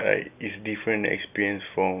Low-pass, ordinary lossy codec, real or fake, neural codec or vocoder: 3.6 kHz; none; real; none